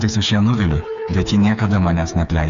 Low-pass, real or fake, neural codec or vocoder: 7.2 kHz; fake; codec, 16 kHz, 4 kbps, FreqCodec, smaller model